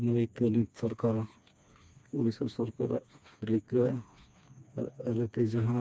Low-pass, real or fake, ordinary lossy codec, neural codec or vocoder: none; fake; none; codec, 16 kHz, 2 kbps, FreqCodec, smaller model